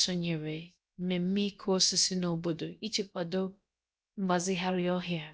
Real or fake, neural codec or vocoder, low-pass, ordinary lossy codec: fake; codec, 16 kHz, about 1 kbps, DyCAST, with the encoder's durations; none; none